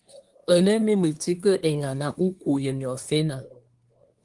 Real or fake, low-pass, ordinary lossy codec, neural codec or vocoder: fake; 10.8 kHz; Opus, 24 kbps; codec, 24 kHz, 1 kbps, SNAC